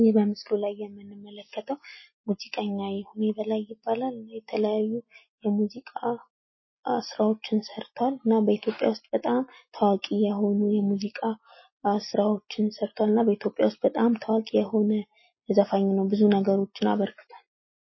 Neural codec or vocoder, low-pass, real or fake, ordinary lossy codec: none; 7.2 kHz; real; MP3, 24 kbps